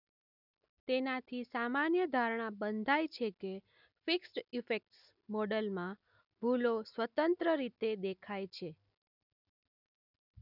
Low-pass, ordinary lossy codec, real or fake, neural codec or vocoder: 5.4 kHz; none; real; none